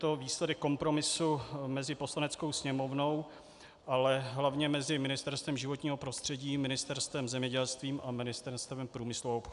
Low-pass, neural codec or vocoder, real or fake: 10.8 kHz; none; real